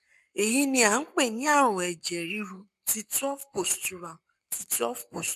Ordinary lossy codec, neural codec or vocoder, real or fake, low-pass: none; codec, 44.1 kHz, 7.8 kbps, Pupu-Codec; fake; 14.4 kHz